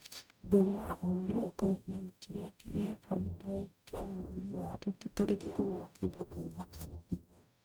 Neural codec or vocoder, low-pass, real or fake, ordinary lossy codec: codec, 44.1 kHz, 0.9 kbps, DAC; none; fake; none